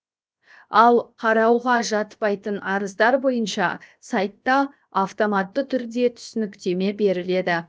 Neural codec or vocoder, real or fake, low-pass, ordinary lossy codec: codec, 16 kHz, 0.7 kbps, FocalCodec; fake; none; none